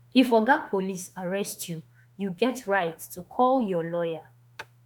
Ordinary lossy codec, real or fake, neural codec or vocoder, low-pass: none; fake; autoencoder, 48 kHz, 32 numbers a frame, DAC-VAE, trained on Japanese speech; none